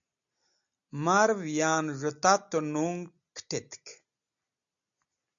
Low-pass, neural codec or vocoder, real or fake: 7.2 kHz; none; real